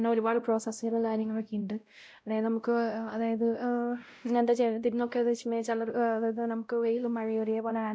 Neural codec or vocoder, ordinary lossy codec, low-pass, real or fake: codec, 16 kHz, 0.5 kbps, X-Codec, WavLM features, trained on Multilingual LibriSpeech; none; none; fake